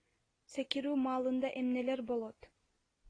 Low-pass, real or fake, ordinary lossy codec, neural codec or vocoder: 9.9 kHz; real; AAC, 32 kbps; none